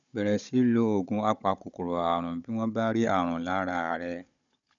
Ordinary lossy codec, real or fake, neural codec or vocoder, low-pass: none; fake; codec, 16 kHz, 16 kbps, FunCodec, trained on Chinese and English, 50 frames a second; 7.2 kHz